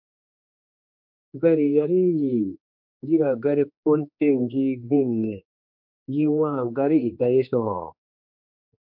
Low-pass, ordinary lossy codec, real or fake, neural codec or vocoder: 5.4 kHz; MP3, 48 kbps; fake; codec, 16 kHz, 2 kbps, X-Codec, HuBERT features, trained on general audio